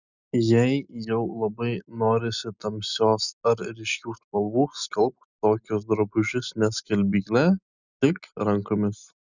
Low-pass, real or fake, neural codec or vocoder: 7.2 kHz; real; none